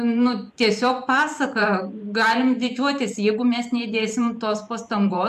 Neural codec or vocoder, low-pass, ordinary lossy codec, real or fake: vocoder, 44.1 kHz, 128 mel bands every 512 samples, BigVGAN v2; 14.4 kHz; MP3, 96 kbps; fake